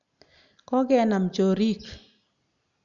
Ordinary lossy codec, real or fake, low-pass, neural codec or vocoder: none; real; 7.2 kHz; none